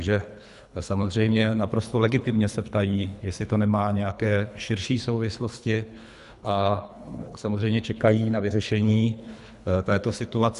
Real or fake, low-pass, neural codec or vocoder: fake; 10.8 kHz; codec, 24 kHz, 3 kbps, HILCodec